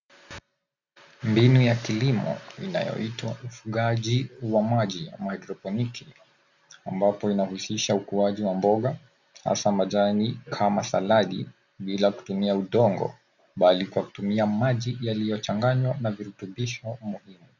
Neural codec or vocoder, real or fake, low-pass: none; real; 7.2 kHz